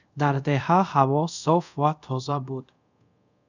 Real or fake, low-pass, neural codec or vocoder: fake; 7.2 kHz; codec, 24 kHz, 0.5 kbps, DualCodec